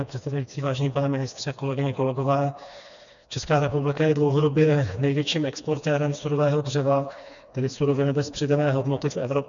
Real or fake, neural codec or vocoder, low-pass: fake; codec, 16 kHz, 2 kbps, FreqCodec, smaller model; 7.2 kHz